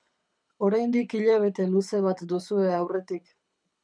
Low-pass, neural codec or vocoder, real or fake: 9.9 kHz; codec, 24 kHz, 6 kbps, HILCodec; fake